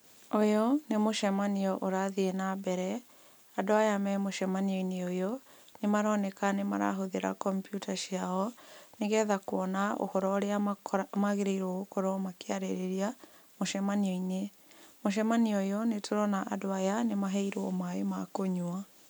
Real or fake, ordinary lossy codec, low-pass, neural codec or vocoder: real; none; none; none